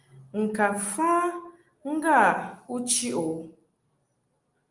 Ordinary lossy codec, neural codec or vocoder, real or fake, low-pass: Opus, 32 kbps; none; real; 10.8 kHz